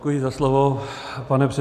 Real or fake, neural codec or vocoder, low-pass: real; none; 14.4 kHz